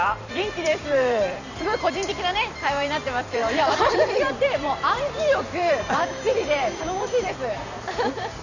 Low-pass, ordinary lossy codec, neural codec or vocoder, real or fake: 7.2 kHz; none; vocoder, 44.1 kHz, 128 mel bands every 512 samples, BigVGAN v2; fake